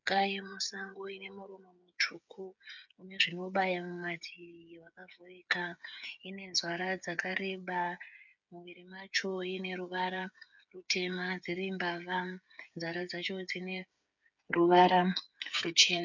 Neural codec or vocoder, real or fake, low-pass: codec, 16 kHz, 8 kbps, FreqCodec, smaller model; fake; 7.2 kHz